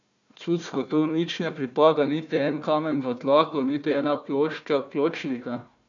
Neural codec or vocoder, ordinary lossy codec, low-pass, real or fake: codec, 16 kHz, 1 kbps, FunCodec, trained on Chinese and English, 50 frames a second; none; 7.2 kHz; fake